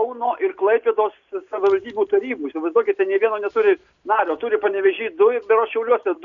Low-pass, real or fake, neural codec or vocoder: 7.2 kHz; real; none